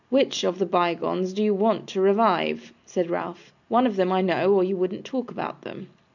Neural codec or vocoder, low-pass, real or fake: none; 7.2 kHz; real